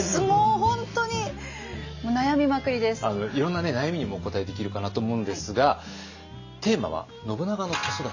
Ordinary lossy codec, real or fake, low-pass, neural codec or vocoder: AAC, 48 kbps; real; 7.2 kHz; none